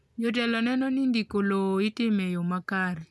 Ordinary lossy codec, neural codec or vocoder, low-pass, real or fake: none; none; none; real